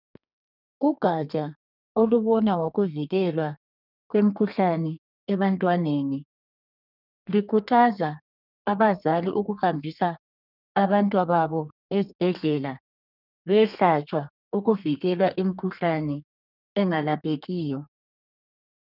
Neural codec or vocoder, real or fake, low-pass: codec, 32 kHz, 1.9 kbps, SNAC; fake; 5.4 kHz